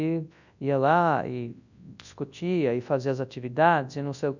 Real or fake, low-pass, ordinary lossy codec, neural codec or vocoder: fake; 7.2 kHz; none; codec, 24 kHz, 0.9 kbps, WavTokenizer, large speech release